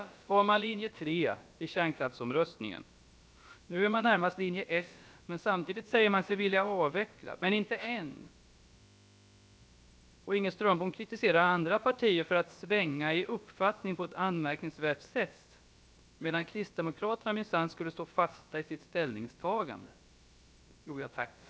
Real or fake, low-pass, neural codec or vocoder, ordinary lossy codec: fake; none; codec, 16 kHz, about 1 kbps, DyCAST, with the encoder's durations; none